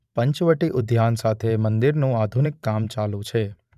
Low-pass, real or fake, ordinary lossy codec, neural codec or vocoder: 14.4 kHz; fake; none; vocoder, 44.1 kHz, 128 mel bands every 512 samples, BigVGAN v2